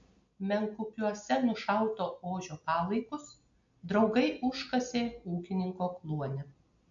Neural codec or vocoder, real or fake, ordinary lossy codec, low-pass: none; real; AAC, 64 kbps; 7.2 kHz